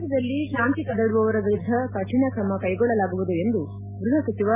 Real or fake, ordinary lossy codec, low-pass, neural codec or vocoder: real; MP3, 24 kbps; 3.6 kHz; none